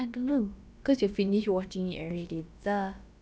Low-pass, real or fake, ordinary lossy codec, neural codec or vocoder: none; fake; none; codec, 16 kHz, about 1 kbps, DyCAST, with the encoder's durations